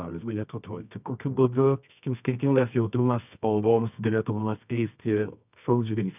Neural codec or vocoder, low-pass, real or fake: codec, 24 kHz, 0.9 kbps, WavTokenizer, medium music audio release; 3.6 kHz; fake